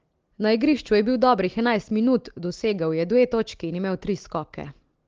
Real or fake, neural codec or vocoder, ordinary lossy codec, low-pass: real; none; Opus, 16 kbps; 7.2 kHz